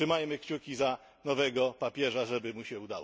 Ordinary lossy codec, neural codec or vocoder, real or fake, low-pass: none; none; real; none